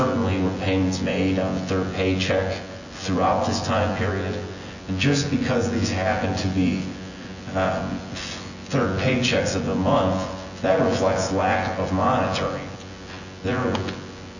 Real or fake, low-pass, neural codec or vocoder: fake; 7.2 kHz; vocoder, 24 kHz, 100 mel bands, Vocos